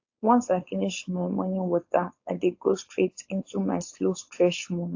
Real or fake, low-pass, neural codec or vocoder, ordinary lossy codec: fake; 7.2 kHz; codec, 16 kHz, 4.8 kbps, FACodec; AAC, 48 kbps